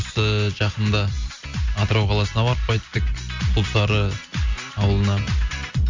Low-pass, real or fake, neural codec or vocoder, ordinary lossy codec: 7.2 kHz; real; none; MP3, 48 kbps